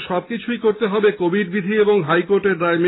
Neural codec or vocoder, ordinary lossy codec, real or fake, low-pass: none; AAC, 16 kbps; real; 7.2 kHz